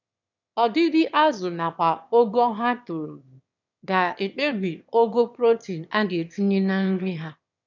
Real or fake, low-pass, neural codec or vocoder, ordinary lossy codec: fake; 7.2 kHz; autoencoder, 22.05 kHz, a latent of 192 numbers a frame, VITS, trained on one speaker; none